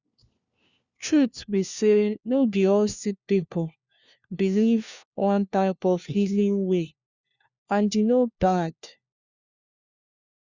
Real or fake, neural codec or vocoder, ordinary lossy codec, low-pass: fake; codec, 16 kHz, 1 kbps, FunCodec, trained on LibriTTS, 50 frames a second; Opus, 64 kbps; 7.2 kHz